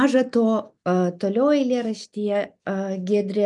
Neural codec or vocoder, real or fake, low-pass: none; real; 10.8 kHz